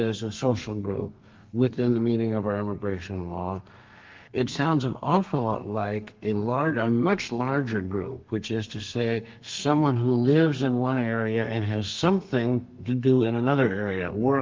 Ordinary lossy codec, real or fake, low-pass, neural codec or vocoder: Opus, 32 kbps; fake; 7.2 kHz; codec, 32 kHz, 1.9 kbps, SNAC